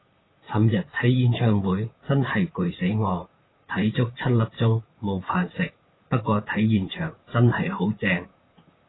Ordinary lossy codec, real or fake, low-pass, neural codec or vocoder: AAC, 16 kbps; fake; 7.2 kHz; vocoder, 44.1 kHz, 128 mel bands, Pupu-Vocoder